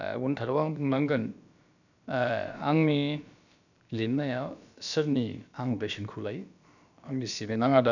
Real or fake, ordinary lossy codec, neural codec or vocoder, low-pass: fake; none; codec, 16 kHz, about 1 kbps, DyCAST, with the encoder's durations; 7.2 kHz